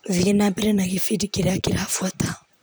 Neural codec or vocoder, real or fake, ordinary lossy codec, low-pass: vocoder, 44.1 kHz, 128 mel bands, Pupu-Vocoder; fake; none; none